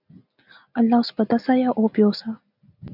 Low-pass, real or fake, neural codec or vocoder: 5.4 kHz; real; none